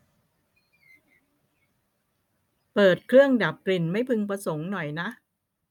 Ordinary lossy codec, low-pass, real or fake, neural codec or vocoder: none; 19.8 kHz; real; none